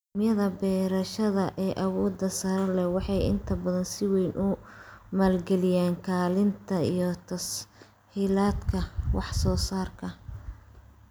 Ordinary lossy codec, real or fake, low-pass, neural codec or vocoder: none; real; none; none